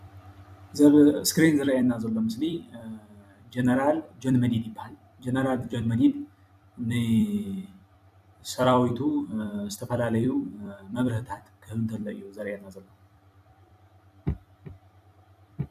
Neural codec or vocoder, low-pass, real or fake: vocoder, 44.1 kHz, 128 mel bands every 256 samples, BigVGAN v2; 14.4 kHz; fake